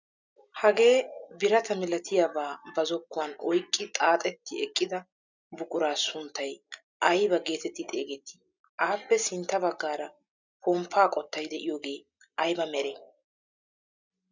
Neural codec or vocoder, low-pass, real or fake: none; 7.2 kHz; real